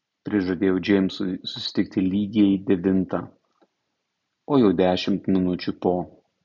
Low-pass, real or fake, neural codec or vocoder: 7.2 kHz; real; none